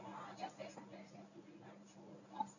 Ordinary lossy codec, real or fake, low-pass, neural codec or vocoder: none; fake; 7.2 kHz; codec, 24 kHz, 0.9 kbps, WavTokenizer, medium speech release version 1